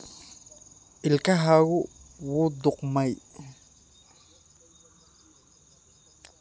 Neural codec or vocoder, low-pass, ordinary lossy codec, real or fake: none; none; none; real